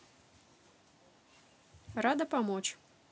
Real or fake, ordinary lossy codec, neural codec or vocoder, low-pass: real; none; none; none